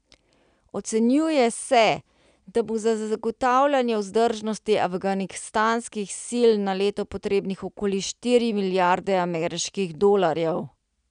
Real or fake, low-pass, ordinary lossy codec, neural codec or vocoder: real; 9.9 kHz; none; none